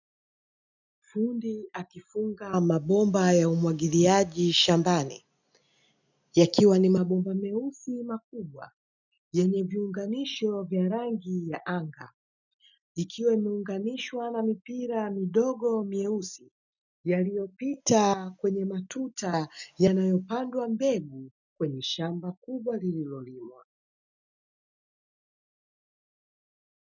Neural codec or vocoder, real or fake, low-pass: none; real; 7.2 kHz